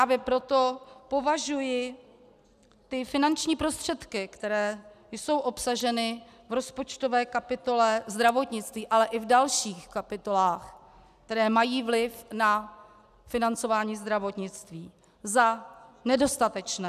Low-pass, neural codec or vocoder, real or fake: 14.4 kHz; none; real